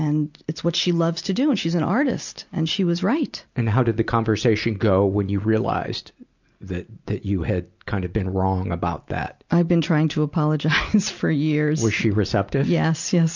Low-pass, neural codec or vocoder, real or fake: 7.2 kHz; none; real